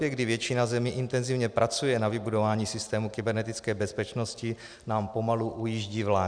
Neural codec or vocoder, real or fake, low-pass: none; real; 9.9 kHz